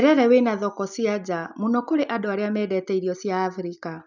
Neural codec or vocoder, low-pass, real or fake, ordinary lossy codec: none; 7.2 kHz; real; none